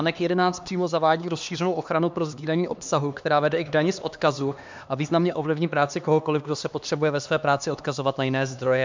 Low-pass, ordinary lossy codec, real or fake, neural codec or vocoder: 7.2 kHz; MP3, 64 kbps; fake; codec, 16 kHz, 2 kbps, X-Codec, HuBERT features, trained on LibriSpeech